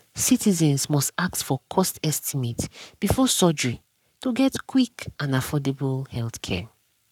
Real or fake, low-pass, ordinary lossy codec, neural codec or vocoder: fake; 19.8 kHz; none; codec, 44.1 kHz, 7.8 kbps, Pupu-Codec